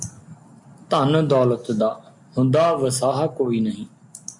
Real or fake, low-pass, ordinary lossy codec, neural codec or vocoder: real; 10.8 kHz; MP3, 48 kbps; none